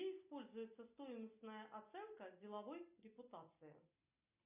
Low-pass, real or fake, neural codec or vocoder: 3.6 kHz; real; none